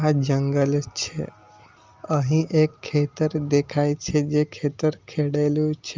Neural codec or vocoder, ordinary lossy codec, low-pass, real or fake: none; Opus, 32 kbps; 7.2 kHz; real